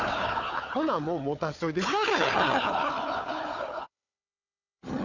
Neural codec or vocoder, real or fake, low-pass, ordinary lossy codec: codec, 16 kHz, 4 kbps, FunCodec, trained on Chinese and English, 50 frames a second; fake; 7.2 kHz; none